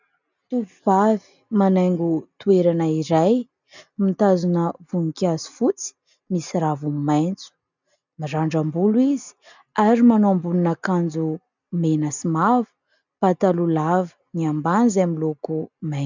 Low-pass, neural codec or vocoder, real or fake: 7.2 kHz; none; real